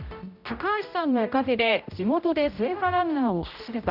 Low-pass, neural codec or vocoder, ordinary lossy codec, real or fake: 5.4 kHz; codec, 16 kHz, 0.5 kbps, X-Codec, HuBERT features, trained on general audio; none; fake